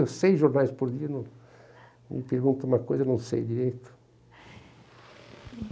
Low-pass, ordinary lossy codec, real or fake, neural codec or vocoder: none; none; real; none